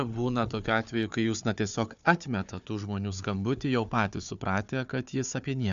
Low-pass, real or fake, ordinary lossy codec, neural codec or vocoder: 7.2 kHz; fake; AAC, 96 kbps; codec, 16 kHz, 4 kbps, FunCodec, trained on Chinese and English, 50 frames a second